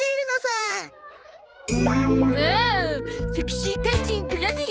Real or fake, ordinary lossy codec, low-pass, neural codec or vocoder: fake; none; none; codec, 16 kHz, 4 kbps, X-Codec, HuBERT features, trained on balanced general audio